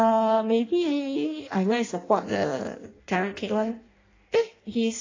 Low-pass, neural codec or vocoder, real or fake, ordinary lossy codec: 7.2 kHz; codec, 16 kHz in and 24 kHz out, 0.6 kbps, FireRedTTS-2 codec; fake; AAC, 32 kbps